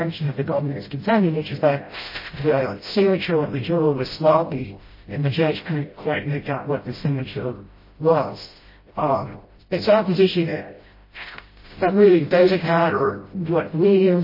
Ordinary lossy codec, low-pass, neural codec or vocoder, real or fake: MP3, 24 kbps; 5.4 kHz; codec, 16 kHz, 0.5 kbps, FreqCodec, smaller model; fake